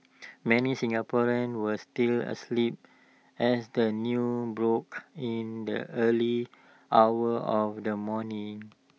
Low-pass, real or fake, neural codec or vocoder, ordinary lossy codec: none; real; none; none